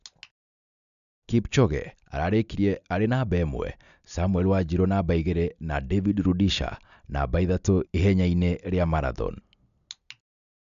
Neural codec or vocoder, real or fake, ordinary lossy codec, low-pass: none; real; none; 7.2 kHz